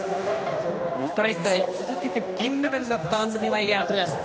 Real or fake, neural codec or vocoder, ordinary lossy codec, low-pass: fake; codec, 16 kHz, 1 kbps, X-Codec, HuBERT features, trained on general audio; none; none